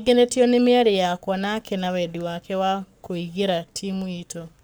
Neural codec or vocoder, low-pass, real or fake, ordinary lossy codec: codec, 44.1 kHz, 7.8 kbps, Pupu-Codec; none; fake; none